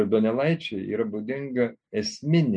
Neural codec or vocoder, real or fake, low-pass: none; real; 9.9 kHz